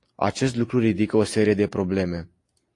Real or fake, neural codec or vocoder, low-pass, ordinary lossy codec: real; none; 10.8 kHz; AAC, 48 kbps